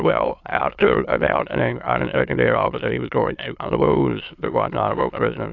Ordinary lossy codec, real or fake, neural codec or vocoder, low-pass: AAC, 48 kbps; fake; autoencoder, 22.05 kHz, a latent of 192 numbers a frame, VITS, trained on many speakers; 7.2 kHz